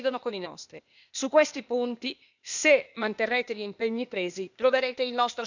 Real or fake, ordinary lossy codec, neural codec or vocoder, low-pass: fake; none; codec, 16 kHz, 0.8 kbps, ZipCodec; 7.2 kHz